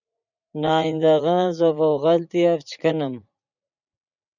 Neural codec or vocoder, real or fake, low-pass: vocoder, 44.1 kHz, 80 mel bands, Vocos; fake; 7.2 kHz